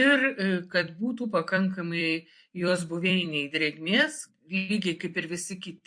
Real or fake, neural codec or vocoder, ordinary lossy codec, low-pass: fake; vocoder, 44.1 kHz, 128 mel bands every 256 samples, BigVGAN v2; MP3, 48 kbps; 9.9 kHz